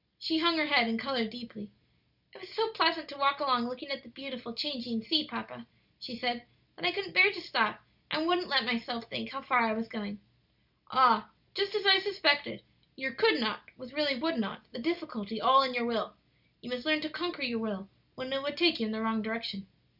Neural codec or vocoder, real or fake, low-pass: none; real; 5.4 kHz